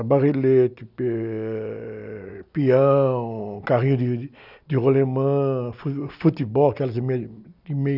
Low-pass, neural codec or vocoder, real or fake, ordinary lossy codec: 5.4 kHz; none; real; none